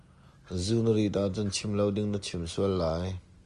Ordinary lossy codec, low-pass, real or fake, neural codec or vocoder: Opus, 32 kbps; 10.8 kHz; real; none